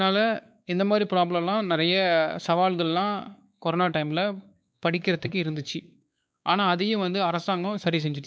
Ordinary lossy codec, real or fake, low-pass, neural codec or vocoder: none; fake; none; codec, 16 kHz, 4 kbps, X-Codec, WavLM features, trained on Multilingual LibriSpeech